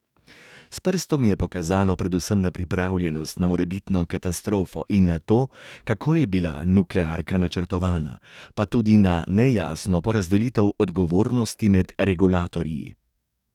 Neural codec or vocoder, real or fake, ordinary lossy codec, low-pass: codec, 44.1 kHz, 2.6 kbps, DAC; fake; none; 19.8 kHz